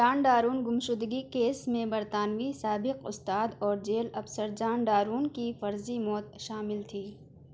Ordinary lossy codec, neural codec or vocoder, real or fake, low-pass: none; none; real; none